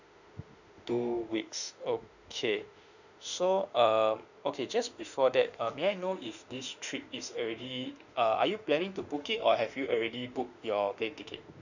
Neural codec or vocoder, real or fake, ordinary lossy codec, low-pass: autoencoder, 48 kHz, 32 numbers a frame, DAC-VAE, trained on Japanese speech; fake; none; 7.2 kHz